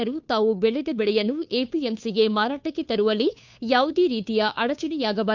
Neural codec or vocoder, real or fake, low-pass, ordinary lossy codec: codec, 16 kHz, 2 kbps, FunCodec, trained on Chinese and English, 25 frames a second; fake; 7.2 kHz; none